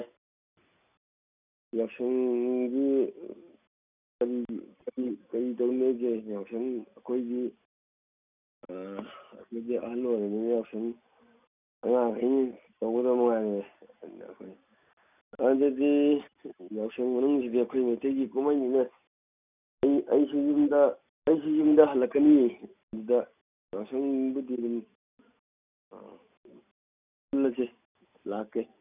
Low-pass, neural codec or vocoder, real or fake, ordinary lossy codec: 3.6 kHz; none; real; none